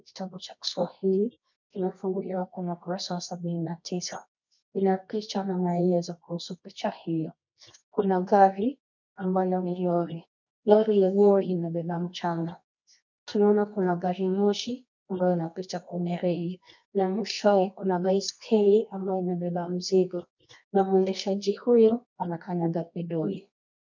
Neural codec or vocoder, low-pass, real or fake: codec, 24 kHz, 0.9 kbps, WavTokenizer, medium music audio release; 7.2 kHz; fake